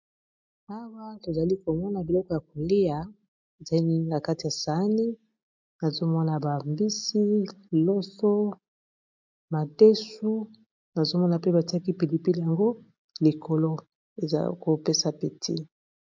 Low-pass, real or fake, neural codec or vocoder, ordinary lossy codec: 7.2 kHz; real; none; MP3, 64 kbps